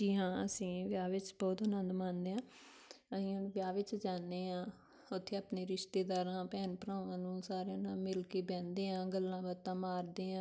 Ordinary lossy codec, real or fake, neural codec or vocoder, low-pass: none; real; none; none